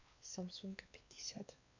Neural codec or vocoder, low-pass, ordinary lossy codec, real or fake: codec, 16 kHz, 2 kbps, X-Codec, WavLM features, trained on Multilingual LibriSpeech; 7.2 kHz; AAC, 48 kbps; fake